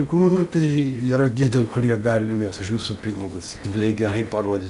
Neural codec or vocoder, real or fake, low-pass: codec, 16 kHz in and 24 kHz out, 0.8 kbps, FocalCodec, streaming, 65536 codes; fake; 10.8 kHz